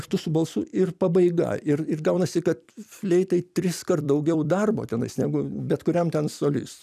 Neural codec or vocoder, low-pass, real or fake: vocoder, 44.1 kHz, 128 mel bands every 256 samples, BigVGAN v2; 14.4 kHz; fake